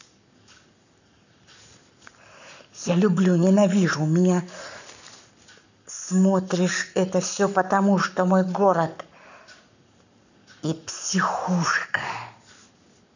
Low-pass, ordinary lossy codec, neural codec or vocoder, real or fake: 7.2 kHz; none; codec, 44.1 kHz, 7.8 kbps, Pupu-Codec; fake